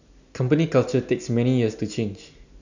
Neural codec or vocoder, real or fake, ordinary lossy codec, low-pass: none; real; none; 7.2 kHz